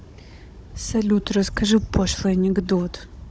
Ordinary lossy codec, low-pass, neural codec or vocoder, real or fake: none; none; codec, 16 kHz, 16 kbps, FunCodec, trained on Chinese and English, 50 frames a second; fake